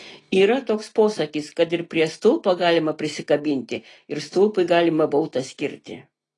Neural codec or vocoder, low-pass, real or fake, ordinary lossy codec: none; 10.8 kHz; real; AAC, 32 kbps